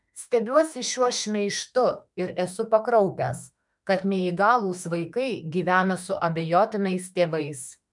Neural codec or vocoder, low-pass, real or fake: autoencoder, 48 kHz, 32 numbers a frame, DAC-VAE, trained on Japanese speech; 10.8 kHz; fake